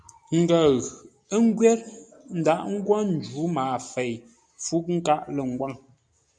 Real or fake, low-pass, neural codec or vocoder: real; 9.9 kHz; none